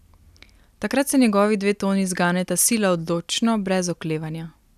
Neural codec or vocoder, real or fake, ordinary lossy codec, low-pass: none; real; none; 14.4 kHz